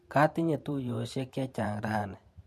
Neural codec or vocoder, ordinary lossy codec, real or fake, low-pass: vocoder, 44.1 kHz, 128 mel bands every 512 samples, BigVGAN v2; MP3, 64 kbps; fake; 14.4 kHz